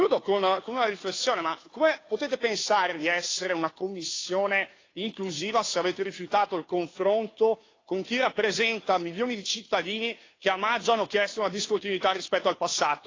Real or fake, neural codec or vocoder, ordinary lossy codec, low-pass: fake; codec, 16 kHz, 2 kbps, FunCodec, trained on Chinese and English, 25 frames a second; AAC, 32 kbps; 7.2 kHz